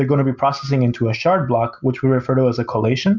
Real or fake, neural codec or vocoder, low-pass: real; none; 7.2 kHz